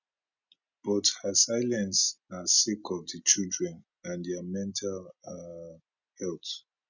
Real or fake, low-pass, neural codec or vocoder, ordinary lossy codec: real; 7.2 kHz; none; none